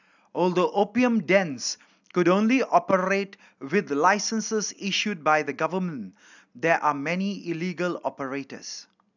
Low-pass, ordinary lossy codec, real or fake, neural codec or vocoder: 7.2 kHz; none; real; none